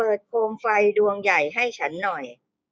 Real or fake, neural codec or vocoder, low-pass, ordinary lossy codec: fake; codec, 16 kHz, 6 kbps, DAC; none; none